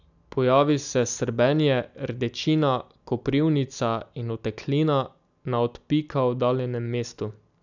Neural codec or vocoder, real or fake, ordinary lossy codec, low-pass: none; real; none; 7.2 kHz